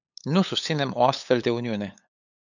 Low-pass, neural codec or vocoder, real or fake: 7.2 kHz; codec, 16 kHz, 8 kbps, FunCodec, trained on LibriTTS, 25 frames a second; fake